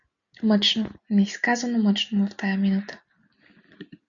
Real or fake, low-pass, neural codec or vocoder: real; 7.2 kHz; none